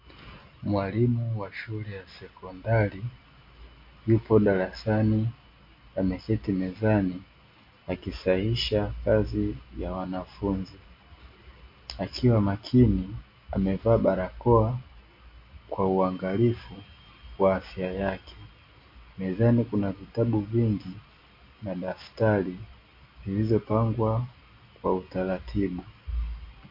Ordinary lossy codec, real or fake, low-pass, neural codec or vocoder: MP3, 32 kbps; real; 5.4 kHz; none